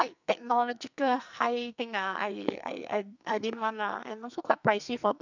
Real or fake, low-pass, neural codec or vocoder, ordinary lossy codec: fake; 7.2 kHz; codec, 44.1 kHz, 2.6 kbps, SNAC; none